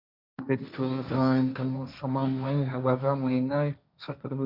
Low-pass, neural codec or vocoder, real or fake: 5.4 kHz; codec, 16 kHz, 1.1 kbps, Voila-Tokenizer; fake